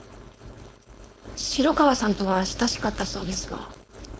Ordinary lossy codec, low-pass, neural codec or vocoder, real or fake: none; none; codec, 16 kHz, 4.8 kbps, FACodec; fake